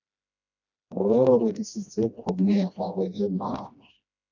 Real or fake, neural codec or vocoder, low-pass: fake; codec, 16 kHz, 1 kbps, FreqCodec, smaller model; 7.2 kHz